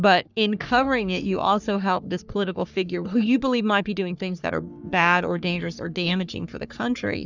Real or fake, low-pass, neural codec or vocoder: fake; 7.2 kHz; codec, 44.1 kHz, 3.4 kbps, Pupu-Codec